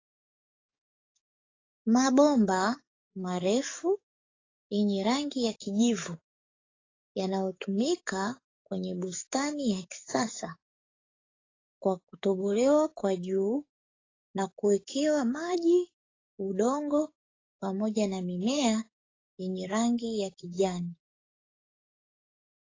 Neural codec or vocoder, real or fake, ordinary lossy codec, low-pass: codec, 44.1 kHz, 7.8 kbps, DAC; fake; AAC, 32 kbps; 7.2 kHz